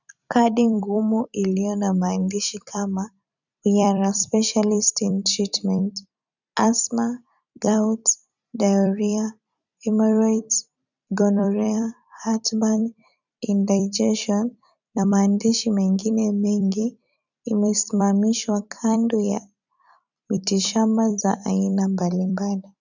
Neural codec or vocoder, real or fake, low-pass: vocoder, 44.1 kHz, 128 mel bands every 256 samples, BigVGAN v2; fake; 7.2 kHz